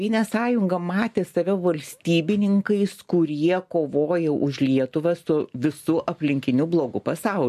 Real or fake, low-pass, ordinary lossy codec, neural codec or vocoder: real; 14.4 kHz; MP3, 64 kbps; none